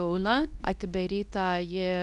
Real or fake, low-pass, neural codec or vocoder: fake; 10.8 kHz; codec, 24 kHz, 0.9 kbps, WavTokenizer, medium speech release version 2